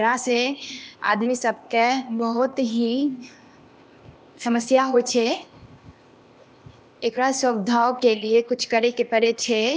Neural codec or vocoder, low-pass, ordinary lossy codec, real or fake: codec, 16 kHz, 0.8 kbps, ZipCodec; none; none; fake